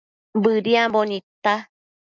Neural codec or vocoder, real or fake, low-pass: none; real; 7.2 kHz